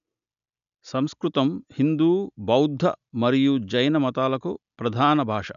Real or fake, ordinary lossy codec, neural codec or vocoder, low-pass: real; none; none; 7.2 kHz